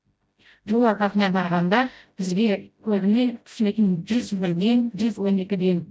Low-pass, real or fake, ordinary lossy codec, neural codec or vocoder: none; fake; none; codec, 16 kHz, 0.5 kbps, FreqCodec, smaller model